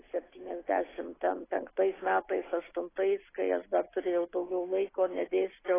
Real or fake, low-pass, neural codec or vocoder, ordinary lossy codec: real; 3.6 kHz; none; AAC, 16 kbps